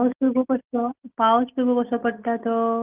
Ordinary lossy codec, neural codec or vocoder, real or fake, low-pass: Opus, 32 kbps; none; real; 3.6 kHz